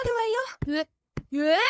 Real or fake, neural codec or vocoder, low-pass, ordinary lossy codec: fake; codec, 16 kHz, 8 kbps, FunCodec, trained on LibriTTS, 25 frames a second; none; none